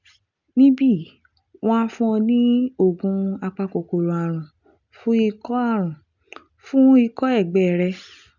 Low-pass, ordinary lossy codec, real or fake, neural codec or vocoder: 7.2 kHz; none; real; none